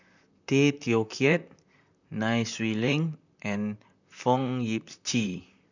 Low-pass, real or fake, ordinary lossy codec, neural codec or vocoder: 7.2 kHz; fake; none; vocoder, 44.1 kHz, 128 mel bands, Pupu-Vocoder